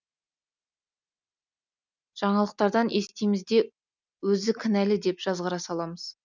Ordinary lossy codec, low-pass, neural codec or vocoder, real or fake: none; 7.2 kHz; none; real